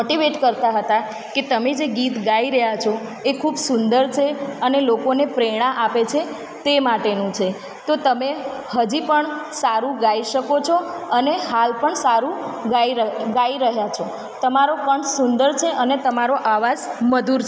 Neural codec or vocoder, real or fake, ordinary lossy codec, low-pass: none; real; none; none